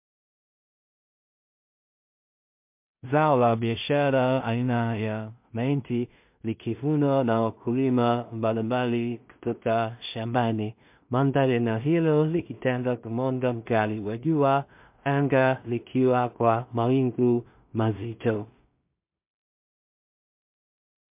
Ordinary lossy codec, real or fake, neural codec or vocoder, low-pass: MP3, 32 kbps; fake; codec, 16 kHz in and 24 kHz out, 0.4 kbps, LongCat-Audio-Codec, two codebook decoder; 3.6 kHz